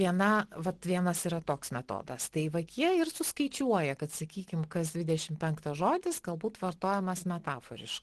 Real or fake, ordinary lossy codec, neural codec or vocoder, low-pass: real; Opus, 16 kbps; none; 10.8 kHz